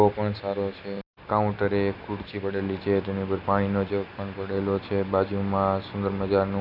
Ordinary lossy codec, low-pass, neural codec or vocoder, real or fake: AAC, 48 kbps; 5.4 kHz; none; real